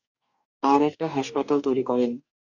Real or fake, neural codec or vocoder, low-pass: fake; codec, 44.1 kHz, 2.6 kbps, DAC; 7.2 kHz